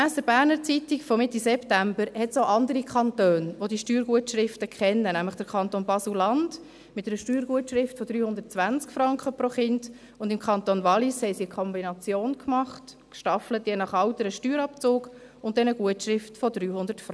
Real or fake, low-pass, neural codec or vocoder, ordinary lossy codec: real; none; none; none